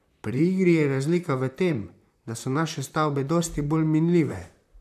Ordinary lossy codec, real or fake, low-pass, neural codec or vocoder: none; fake; 14.4 kHz; vocoder, 44.1 kHz, 128 mel bands, Pupu-Vocoder